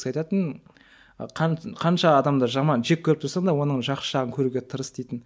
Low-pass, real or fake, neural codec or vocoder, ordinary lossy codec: none; real; none; none